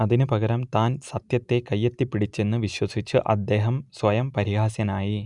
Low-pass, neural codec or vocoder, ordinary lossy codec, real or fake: 10.8 kHz; none; none; real